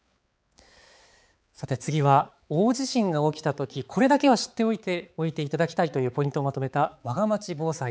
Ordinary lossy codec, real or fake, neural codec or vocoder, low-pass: none; fake; codec, 16 kHz, 4 kbps, X-Codec, HuBERT features, trained on balanced general audio; none